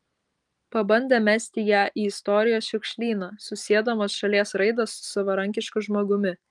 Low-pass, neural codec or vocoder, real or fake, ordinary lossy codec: 10.8 kHz; none; real; Opus, 32 kbps